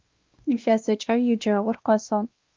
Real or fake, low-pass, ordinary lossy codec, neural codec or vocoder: fake; 7.2 kHz; Opus, 24 kbps; codec, 16 kHz, 1 kbps, X-Codec, WavLM features, trained on Multilingual LibriSpeech